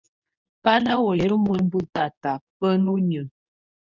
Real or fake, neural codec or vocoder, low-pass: fake; codec, 24 kHz, 0.9 kbps, WavTokenizer, medium speech release version 2; 7.2 kHz